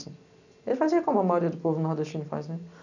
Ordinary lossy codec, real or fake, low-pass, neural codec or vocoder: none; real; 7.2 kHz; none